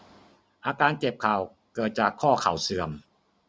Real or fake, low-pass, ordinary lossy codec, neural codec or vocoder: real; none; none; none